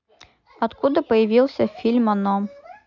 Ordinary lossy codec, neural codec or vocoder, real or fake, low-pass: none; none; real; 7.2 kHz